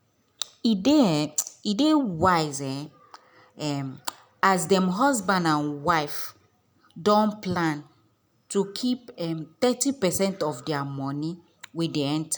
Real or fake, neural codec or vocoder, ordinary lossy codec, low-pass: real; none; none; none